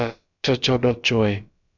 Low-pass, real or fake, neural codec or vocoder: 7.2 kHz; fake; codec, 16 kHz, about 1 kbps, DyCAST, with the encoder's durations